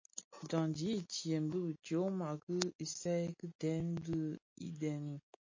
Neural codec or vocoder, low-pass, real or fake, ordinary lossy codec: none; 7.2 kHz; real; MP3, 32 kbps